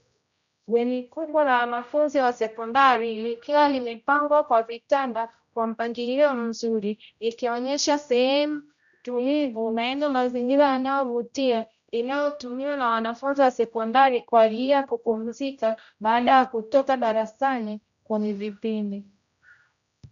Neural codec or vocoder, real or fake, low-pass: codec, 16 kHz, 0.5 kbps, X-Codec, HuBERT features, trained on general audio; fake; 7.2 kHz